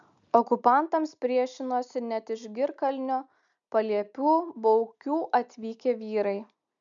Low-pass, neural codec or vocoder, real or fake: 7.2 kHz; none; real